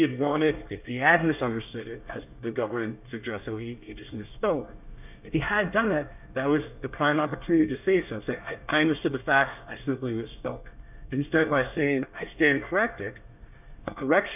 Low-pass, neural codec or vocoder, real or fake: 3.6 kHz; codec, 24 kHz, 1 kbps, SNAC; fake